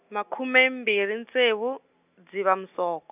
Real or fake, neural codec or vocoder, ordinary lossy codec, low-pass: real; none; none; 3.6 kHz